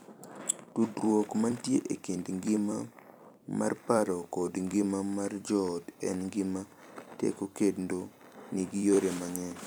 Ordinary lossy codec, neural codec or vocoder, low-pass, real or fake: none; vocoder, 44.1 kHz, 128 mel bands every 256 samples, BigVGAN v2; none; fake